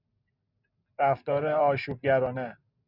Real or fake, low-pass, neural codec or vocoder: real; 5.4 kHz; none